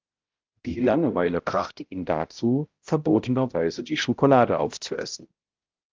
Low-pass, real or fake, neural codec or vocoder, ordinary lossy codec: 7.2 kHz; fake; codec, 16 kHz, 0.5 kbps, X-Codec, HuBERT features, trained on balanced general audio; Opus, 32 kbps